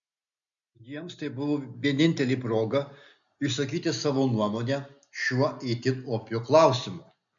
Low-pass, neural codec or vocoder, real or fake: 7.2 kHz; none; real